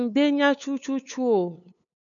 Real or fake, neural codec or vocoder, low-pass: fake; codec, 16 kHz, 8 kbps, FunCodec, trained on LibriTTS, 25 frames a second; 7.2 kHz